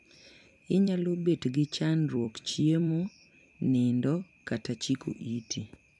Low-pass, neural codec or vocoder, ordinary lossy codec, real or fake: 10.8 kHz; vocoder, 44.1 kHz, 128 mel bands every 256 samples, BigVGAN v2; none; fake